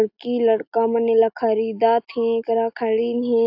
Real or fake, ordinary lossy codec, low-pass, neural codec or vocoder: real; AAC, 48 kbps; 5.4 kHz; none